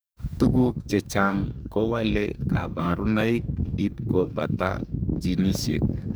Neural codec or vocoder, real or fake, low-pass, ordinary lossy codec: codec, 44.1 kHz, 2.6 kbps, DAC; fake; none; none